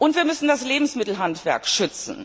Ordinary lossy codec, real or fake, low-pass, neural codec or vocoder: none; real; none; none